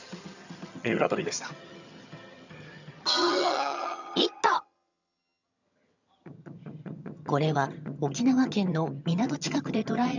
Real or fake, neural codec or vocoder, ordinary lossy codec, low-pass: fake; vocoder, 22.05 kHz, 80 mel bands, HiFi-GAN; none; 7.2 kHz